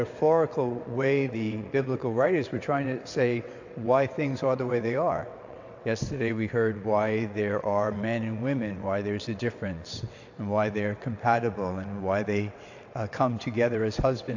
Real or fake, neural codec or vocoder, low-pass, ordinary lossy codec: fake; vocoder, 22.05 kHz, 80 mel bands, WaveNeXt; 7.2 kHz; AAC, 48 kbps